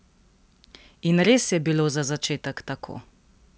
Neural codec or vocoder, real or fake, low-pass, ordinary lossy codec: none; real; none; none